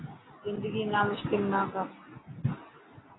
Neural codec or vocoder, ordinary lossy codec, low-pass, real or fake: none; AAC, 16 kbps; 7.2 kHz; real